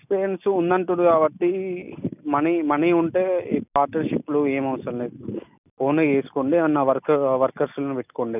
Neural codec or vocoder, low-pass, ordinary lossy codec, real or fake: none; 3.6 kHz; none; real